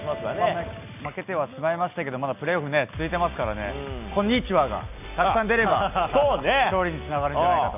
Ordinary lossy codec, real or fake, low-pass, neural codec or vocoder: none; real; 3.6 kHz; none